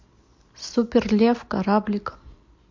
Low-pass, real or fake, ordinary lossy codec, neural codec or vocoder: 7.2 kHz; real; MP3, 48 kbps; none